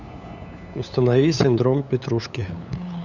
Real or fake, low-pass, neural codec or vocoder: fake; 7.2 kHz; codec, 16 kHz, 8 kbps, FunCodec, trained on LibriTTS, 25 frames a second